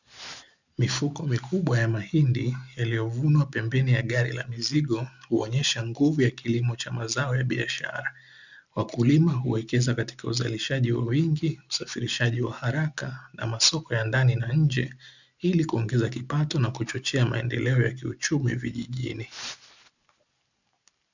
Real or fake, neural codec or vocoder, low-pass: real; none; 7.2 kHz